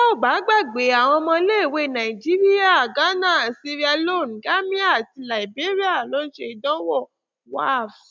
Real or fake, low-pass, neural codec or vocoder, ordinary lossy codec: real; none; none; none